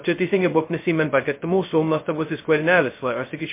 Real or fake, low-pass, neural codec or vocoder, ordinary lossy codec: fake; 3.6 kHz; codec, 16 kHz, 0.2 kbps, FocalCodec; MP3, 24 kbps